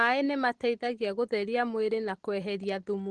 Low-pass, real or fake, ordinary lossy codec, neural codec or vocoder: 10.8 kHz; real; Opus, 16 kbps; none